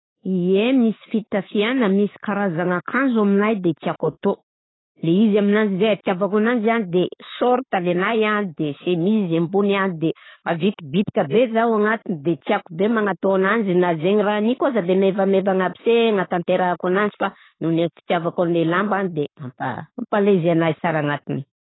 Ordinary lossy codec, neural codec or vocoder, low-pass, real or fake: AAC, 16 kbps; none; 7.2 kHz; real